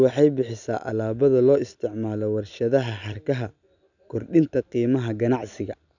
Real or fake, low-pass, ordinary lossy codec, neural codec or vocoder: real; 7.2 kHz; none; none